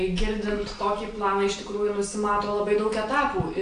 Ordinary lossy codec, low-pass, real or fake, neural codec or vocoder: AAC, 48 kbps; 9.9 kHz; real; none